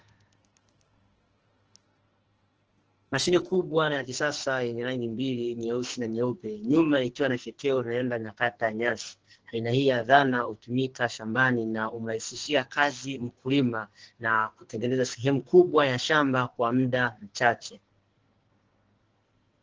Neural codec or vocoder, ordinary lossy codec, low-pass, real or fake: codec, 44.1 kHz, 2.6 kbps, SNAC; Opus, 16 kbps; 7.2 kHz; fake